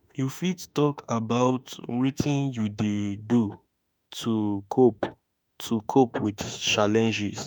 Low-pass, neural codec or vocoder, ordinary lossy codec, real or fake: none; autoencoder, 48 kHz, 32 numbers a frame, DAC-VAE, trained on Japanese speech; none; fake